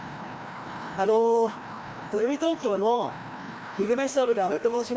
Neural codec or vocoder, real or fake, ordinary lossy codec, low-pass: codec, 16 kHz, 1 kbps, FreqCodec, larger model; fake; none; none